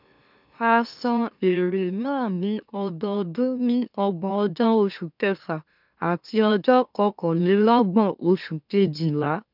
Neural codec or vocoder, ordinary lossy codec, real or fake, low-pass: autoencoder, 44.1 kHz, a latent of 192 numbers a frame, MeloTTS; none; fake; 5.4 kHz